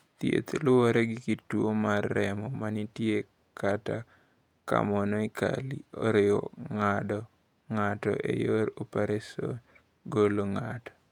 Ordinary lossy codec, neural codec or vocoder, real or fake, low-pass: none; vocoder, 48 kHz, 128 mel bands, Vocos; fake; 19.8 kHz